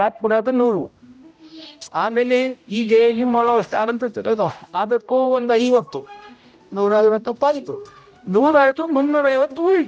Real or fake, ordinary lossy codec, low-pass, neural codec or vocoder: fake; none; none; codec, 16 kHz, 0.5 kbps, X-Codec, HuBERT features, trained on general audio